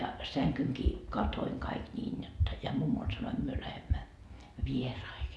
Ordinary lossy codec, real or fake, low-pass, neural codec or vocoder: none; real; none; none